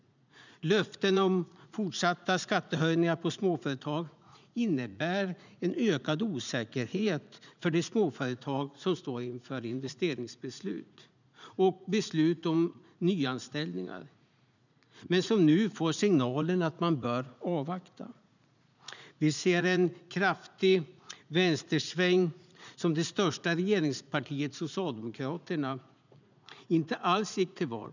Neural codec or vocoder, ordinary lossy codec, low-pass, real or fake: vocoder, 44.1 kHz, 80 mel bands, Vocos; none; 7.2 kHz; fake